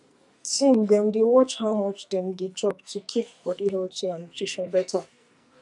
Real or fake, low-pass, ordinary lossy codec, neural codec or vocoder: fake; 10.8 kHz; none; codec, 44.1 kHz, 2.6 kbps, SNAC